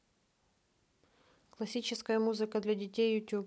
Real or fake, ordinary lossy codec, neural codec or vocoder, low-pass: real; none; none; none